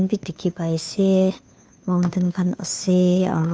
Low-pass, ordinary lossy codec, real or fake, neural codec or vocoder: none; none; fake; codec, 16 kHz, 2 kbps, FunCodec, trained on Chinese and English, 25 frames a second